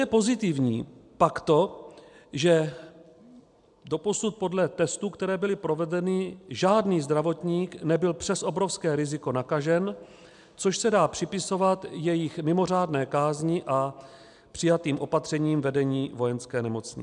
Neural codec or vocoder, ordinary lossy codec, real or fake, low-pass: none; MP3, 96 kbps; real; 10.8 kHz